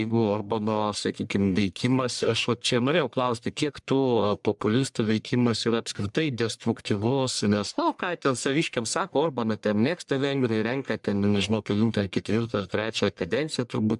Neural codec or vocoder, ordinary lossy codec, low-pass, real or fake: codec, 44.1 kHz, 1.7 kbps, Pupu-Codec; MP3, 96 kbps; 10.8 kHz; fake